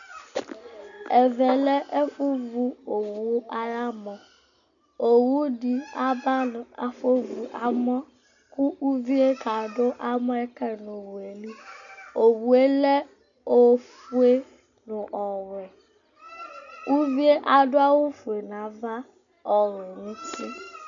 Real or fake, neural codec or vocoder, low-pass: real; none; 7.2 kHz